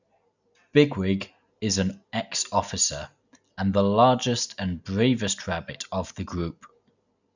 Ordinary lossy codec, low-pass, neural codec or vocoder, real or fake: none; 7.2 kHz; none; real